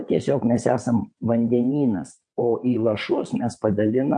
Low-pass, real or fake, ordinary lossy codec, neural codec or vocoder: 9.9 kHz; fake; MP3, 48 kbps; vocoder, 22.05 kHz, 80 mel bands, WaveNeXt